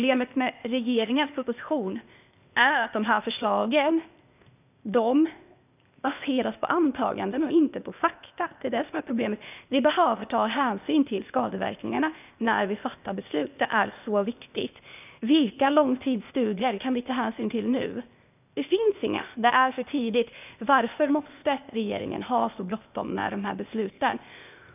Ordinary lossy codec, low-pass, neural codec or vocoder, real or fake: none; 3.6 kHz; codec, 16 kHz, 0.8 kbps, ZipCodec; fake